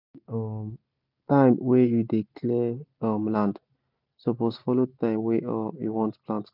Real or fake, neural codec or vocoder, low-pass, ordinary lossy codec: real; none; 5.4 kHz; none